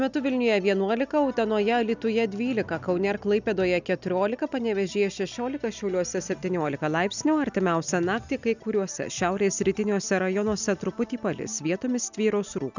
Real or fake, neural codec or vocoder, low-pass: real; none; 7.2 kHz